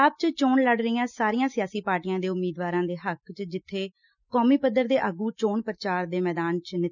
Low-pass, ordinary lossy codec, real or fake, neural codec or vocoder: 7.2 kHz; none; real; none